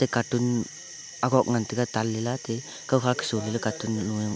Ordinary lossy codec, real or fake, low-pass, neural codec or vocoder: none; real; none; none